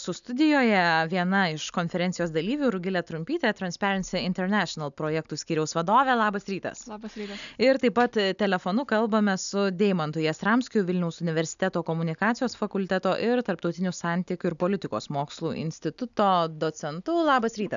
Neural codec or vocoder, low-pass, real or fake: none; 7.2 kHz; real